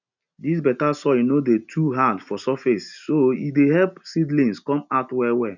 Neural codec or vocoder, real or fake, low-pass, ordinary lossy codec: none; real; 7.2 kHz; none